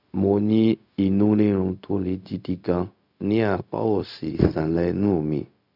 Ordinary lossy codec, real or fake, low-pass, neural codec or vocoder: none; fake; 5.4 kHz; codec, 16 kHz, 0.4 kbps, LongCat-Audio-Codec